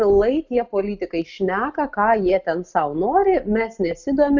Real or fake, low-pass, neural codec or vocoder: real; 7.2 kHz; none